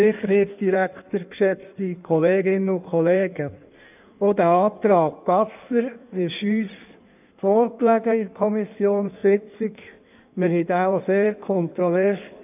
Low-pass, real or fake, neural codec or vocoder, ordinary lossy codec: 3.6 kHz; fake; codec, 16 kHz in and 24 kHz out, 1.1 kbps, FireRedTTS-2 codec; none